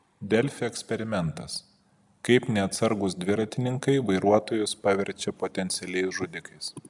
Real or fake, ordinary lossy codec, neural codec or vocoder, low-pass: real; MP3, 96 kbps; none; 10.8 kHz